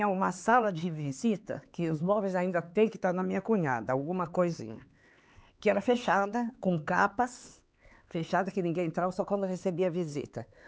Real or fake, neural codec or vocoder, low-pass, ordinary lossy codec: fake; codec, 16 kHz, 4 kbps, X-Codec, HuBERT features, trained on LibriSpeech; none; none